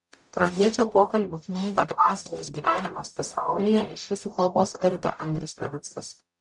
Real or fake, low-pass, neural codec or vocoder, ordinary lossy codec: fake; 10.8 kHz; codec, 44.1 kHz, 0.9 kbps, DAC; MP3, 96 kbps